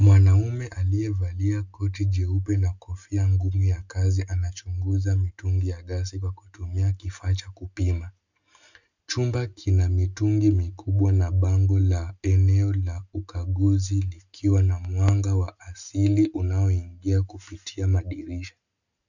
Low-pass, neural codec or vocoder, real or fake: 7.2 kHz; none; real